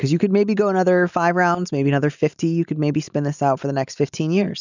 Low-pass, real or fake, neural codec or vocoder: 7.2 kHz; real; none